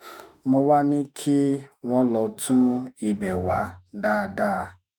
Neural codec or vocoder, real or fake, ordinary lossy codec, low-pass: autoencoder, 48 kHz, 32 numbers a frame, DAC-VAE, trained on Japanese speech; fake; none; none